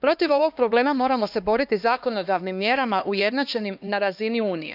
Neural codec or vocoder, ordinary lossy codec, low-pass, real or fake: codec, 16 kHz, 2 kbps, X-Codec, HuBERT features, trained on LibriSpeech; none; 5.4 kHz; fake